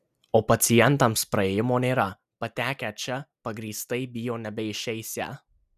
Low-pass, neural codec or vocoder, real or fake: 14.4 kHz; none; real